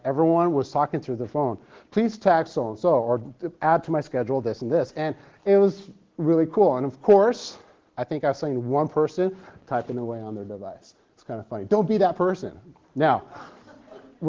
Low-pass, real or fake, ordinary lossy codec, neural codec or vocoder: 7.2 kHz; real; Opus, 16 kbps; none